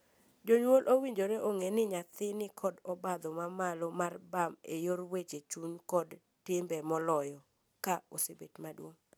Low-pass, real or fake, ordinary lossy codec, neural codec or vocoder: none; real; none; none